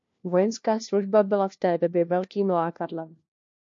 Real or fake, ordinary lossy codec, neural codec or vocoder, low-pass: fake; MP3, 48 kbps; codec, 16 kHz, 1 kbps, FunCodec, trained on LibriTTS, 50 frames a second; 7.2 kHz